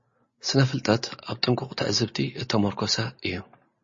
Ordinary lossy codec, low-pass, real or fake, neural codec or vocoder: MP3, 32 kbps; 7.2 kHz; real; none